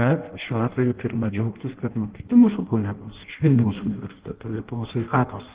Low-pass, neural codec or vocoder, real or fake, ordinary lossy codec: 3.6 kHz; codec, 16 kHz in and 24 kHz out, 0.6 kbps, FireRedTTS-2 codec; fake; Opus, 16 kbps